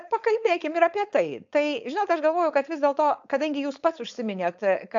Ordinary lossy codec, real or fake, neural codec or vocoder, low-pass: AAC, 64 kbps; fake; codec, 16 kHz, 4.8 kbps, FACodec; 7.2 kHz